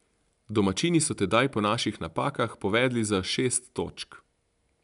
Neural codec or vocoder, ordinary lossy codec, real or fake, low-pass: none; none; real; 10.8 kHz